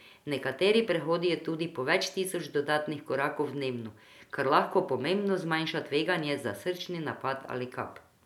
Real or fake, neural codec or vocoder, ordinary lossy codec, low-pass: real; none; none; 19.8 kHz